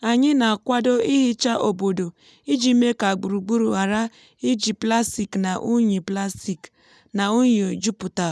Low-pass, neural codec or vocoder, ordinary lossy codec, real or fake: none; none; none; real